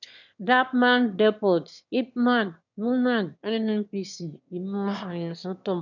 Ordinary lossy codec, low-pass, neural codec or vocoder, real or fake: none; 7.2 kHz; autoencoder, 22.05 kHz, a latent of 192 numbers a frame, VITS, trained on one speaker; fake